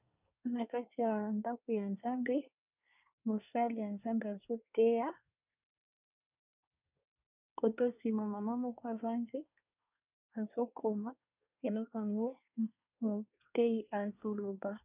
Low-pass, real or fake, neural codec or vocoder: 3.6 kHz; fake; codec, 24 kHz, 1 kbps, SNAC